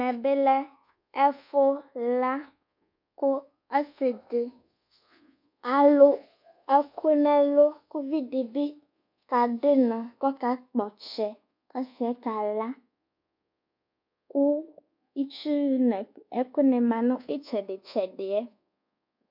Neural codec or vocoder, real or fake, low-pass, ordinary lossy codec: codec, 24 kHz, 1.2 kbps, DualCodec; fake; 5.4 kHz; MP3, 48 kbps